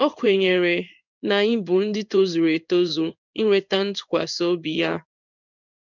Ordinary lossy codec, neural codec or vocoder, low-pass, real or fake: none; codec, 16 kHz in and 24 kHz out, 1 kbps, XY-Tokenizer; 7.2 kHz; fake